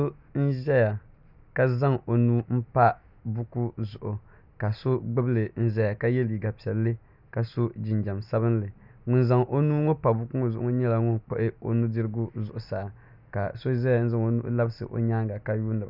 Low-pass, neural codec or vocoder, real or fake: 5.4 kHz; none; real